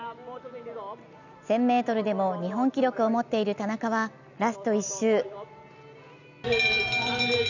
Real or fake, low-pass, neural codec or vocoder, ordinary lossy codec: real; 7.2 kHz; none; none